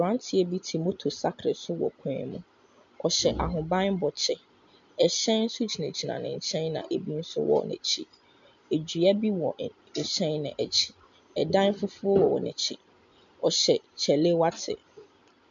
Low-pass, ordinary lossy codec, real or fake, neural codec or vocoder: 7.2 kHz; MP3, 64 kbps; real; none